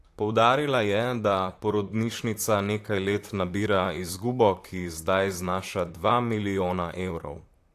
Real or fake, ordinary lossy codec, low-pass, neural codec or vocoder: fake; AAC, 64 kbps; 14.4 kHz; vocoder, 44.1 kHz, 128 mel bands, Pupu-Vocoder